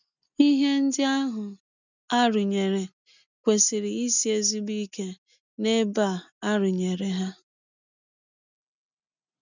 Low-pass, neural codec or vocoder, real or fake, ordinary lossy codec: 7.2 kHz; none; real; none